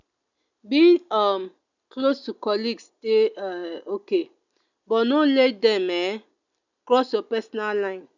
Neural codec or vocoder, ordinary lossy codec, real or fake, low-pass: none; none; real; 7.2 kHz